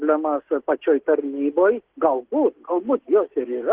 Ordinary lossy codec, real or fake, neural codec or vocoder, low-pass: Opus, 16 kbps; real; none; 3.6 kHz